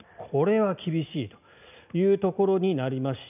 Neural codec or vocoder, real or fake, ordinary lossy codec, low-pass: none; real; none; 3.6 kHz